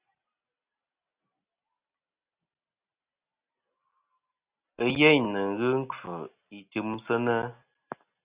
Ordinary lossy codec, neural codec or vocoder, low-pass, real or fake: Opus, 64 kbps; none; 3.6 kHz; real